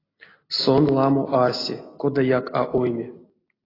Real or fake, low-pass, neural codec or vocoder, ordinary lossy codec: real; 5.4 kHz; none; AAC, 24 kbps